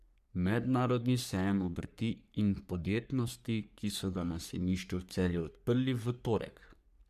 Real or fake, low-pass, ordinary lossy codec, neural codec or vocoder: fake; 14.4 kHz; none; codec, 44.1 kHz, 3.4 kbps, Pupu-Codec